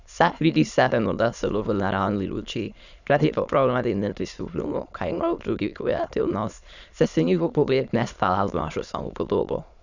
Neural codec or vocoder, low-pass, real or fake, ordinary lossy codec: autoencoder, 22.05 kHz, a latent of 192 numbers a frame, VITS, trained on many speakers; 7.2 kHz; fake; none